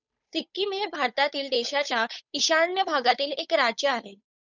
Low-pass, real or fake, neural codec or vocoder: 7.2 kHz; fake; codec, 16 kHz, 8 kbps, FunCodec, trained on Chinese and English, 25 frames a second